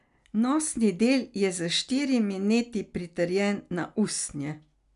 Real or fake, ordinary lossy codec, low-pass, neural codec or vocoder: real; none; 10.8 kHz; none